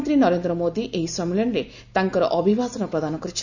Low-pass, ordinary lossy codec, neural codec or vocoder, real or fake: 7.2 kHz; none; none; real